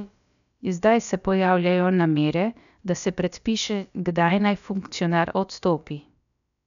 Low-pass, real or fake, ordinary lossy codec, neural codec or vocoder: 7.2 kHz; fake; none; codec, 16 kHz, about 1 kbps, DyCAST, with the encoder's durations